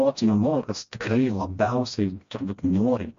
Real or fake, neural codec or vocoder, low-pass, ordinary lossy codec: fake; codec, 16 kHz, 1 kbps, FreqCodec, smaller model; 7.2 kHz; MP3, 48 kbps